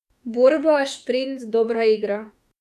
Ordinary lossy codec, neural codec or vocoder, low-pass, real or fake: none; autoencoder, 48 kHz, 32 numbers a frame, DAC-VAE, trained on Japanese speech; 14.4 kHz; fake